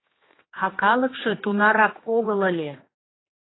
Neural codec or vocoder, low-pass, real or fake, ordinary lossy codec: codec, 16 kHz, 2 kbps, X-Codec, HuBERT features, trained on general audio; 7.2 kHz; fake; AAC, 16 kbps